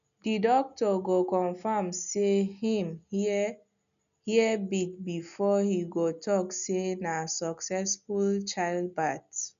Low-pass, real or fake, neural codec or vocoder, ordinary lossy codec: 7.2 kHz; real; none; none